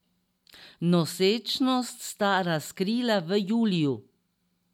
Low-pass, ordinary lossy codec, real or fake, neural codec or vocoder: 19.8 kHz; MP3, 96 kbps; real; none